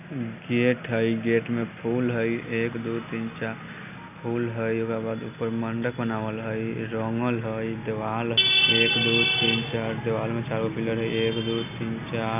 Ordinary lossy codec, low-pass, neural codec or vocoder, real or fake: none; 3.6 kHz; none; real